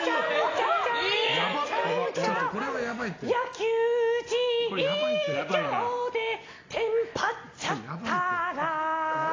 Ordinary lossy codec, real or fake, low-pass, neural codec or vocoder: AAC, 32 kbps; real; 7.2 kHz; none